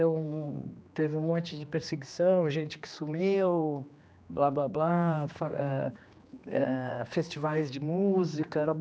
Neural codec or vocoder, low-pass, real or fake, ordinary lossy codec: codec, 16 kHz, 2 kbps, X-Codec, HuBERT features, trained on general audio; none; fake; none